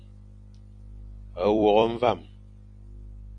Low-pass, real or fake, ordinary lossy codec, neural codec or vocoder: 9.9 kHz; fake; MP3, 64 kbps; vocoder, 44.1 kHz, 128 mel bands every 256 samples, BigVGAN v2